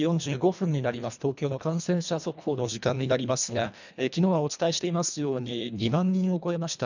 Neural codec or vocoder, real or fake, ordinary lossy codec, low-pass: codec, 24 kHz, 1.5 kbps, HILCodec; fake; none; 7.2 kHz